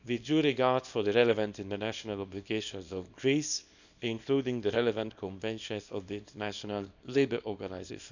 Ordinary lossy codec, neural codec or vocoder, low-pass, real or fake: none; codec, 24 kHz, 0.9 kbps, WavTokenizer, small release; 7.2 kHz; fake